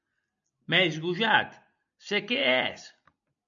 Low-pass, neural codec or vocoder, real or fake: 7.2 kHz; none; real